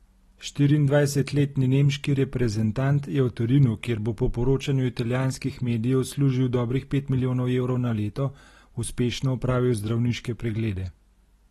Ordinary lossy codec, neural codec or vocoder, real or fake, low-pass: AAC, 32 kbps; none; real; 19.8 kHz